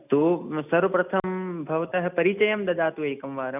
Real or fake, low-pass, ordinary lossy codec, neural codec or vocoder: real; 3.6 kHz; none; none